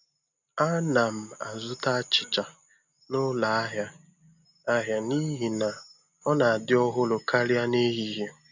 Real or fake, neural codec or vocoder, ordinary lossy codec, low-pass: real; none; none; 7.2 kHz